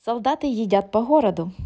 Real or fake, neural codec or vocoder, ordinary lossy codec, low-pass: real; none; none; none